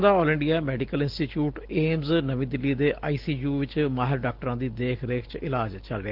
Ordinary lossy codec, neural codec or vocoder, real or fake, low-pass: Opus, 16 kbps; none; real; 5.4 kHz